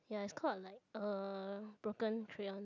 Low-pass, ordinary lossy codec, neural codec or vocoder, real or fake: 7.2 kHz; none; none; real